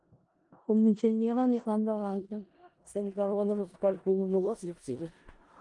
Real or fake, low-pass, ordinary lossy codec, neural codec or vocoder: fake; 10.8 kHz; Opus, 24 kbps; codec, 16 kHz in and 24 kHz out, 0.4 kbps, LongCat-Audio-Codec, four codebook decoder